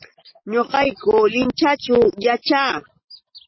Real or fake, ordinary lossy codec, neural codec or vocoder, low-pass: real; MP3, 24 kbps; none; 7.2 kHz